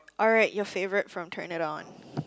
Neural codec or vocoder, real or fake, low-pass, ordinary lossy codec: none; real; none; none